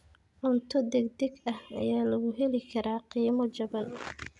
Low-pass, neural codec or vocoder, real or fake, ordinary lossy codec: 10.8 kHz; none; real; none